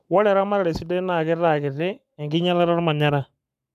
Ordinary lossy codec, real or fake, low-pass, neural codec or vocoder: none; fake; 14.4 kHz; codec, 44.1 kHz, 7.8 kbps, Pupu-Codec